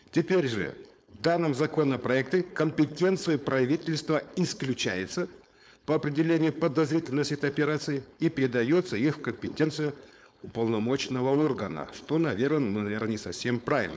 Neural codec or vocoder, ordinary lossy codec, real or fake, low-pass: codec, 16 kHz, 4.8 kbps, FACodec; none; fake; none